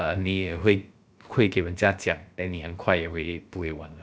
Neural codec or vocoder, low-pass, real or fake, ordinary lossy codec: codec, 16 kHz, about 1 kbps, DyCAST, with the encoder's durations; none; fake; none